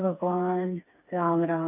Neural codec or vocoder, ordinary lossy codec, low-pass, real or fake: codec, 16 kHz, 4 kbps, FreqCodec, smaller model; AAC, 32 kbps; 3.6 kHz; fake